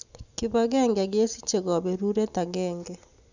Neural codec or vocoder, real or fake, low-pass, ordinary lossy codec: none; real; 7.2 kHz; none